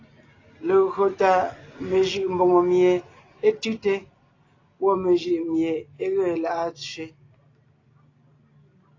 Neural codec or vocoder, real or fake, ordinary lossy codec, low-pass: none; real; AAC, 32 kbps; 7.2 kHz